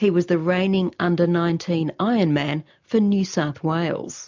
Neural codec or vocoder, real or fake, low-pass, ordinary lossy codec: none; real; 7.2 kHz; MP3, 64 kbps